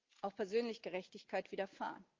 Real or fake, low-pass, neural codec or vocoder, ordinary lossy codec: real; 7.2 kHz; none; Opus, 24 kbps